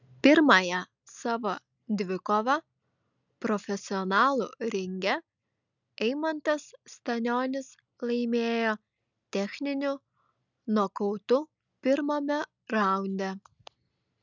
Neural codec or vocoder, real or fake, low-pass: none; real; 7.2 kHz